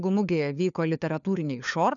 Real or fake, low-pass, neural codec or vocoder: fake; 7.2 kHz; codec, 16 kHz, 4 kbps, FunCodec, trained on LibriTTS, 50 frames a second